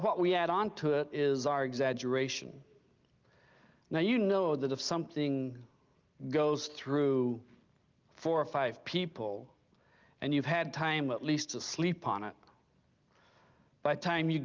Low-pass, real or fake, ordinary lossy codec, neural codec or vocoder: 7.2 kHz; real; Opus, 32 kbps; none